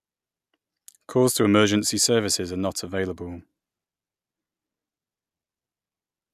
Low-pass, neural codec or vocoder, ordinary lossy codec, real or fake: 14.4 kHz; none; none; real